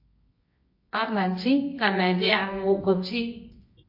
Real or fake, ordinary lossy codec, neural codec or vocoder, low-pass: fake; MP3, 32 kbps; codec, 24 kHz, 0.9 kbps, WavTokenizer, medium music audio release; 5.4 kHz